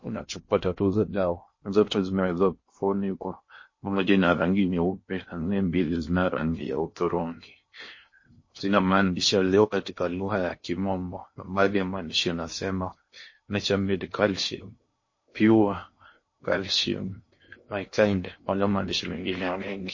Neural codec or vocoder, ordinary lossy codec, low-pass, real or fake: codec, 16 kHz in and 24 kHz out, 0.6 kbps, FocalCodec, streaming, 2048 codes; MP3, 32 kbps; 7.2 kHz; fake